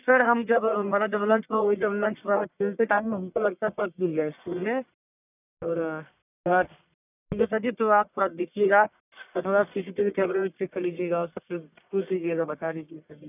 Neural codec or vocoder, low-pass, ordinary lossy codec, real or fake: codec, 44.1 kHz, 1.7 kbps, Pupu-Codec; 3.6 kHz; none; fake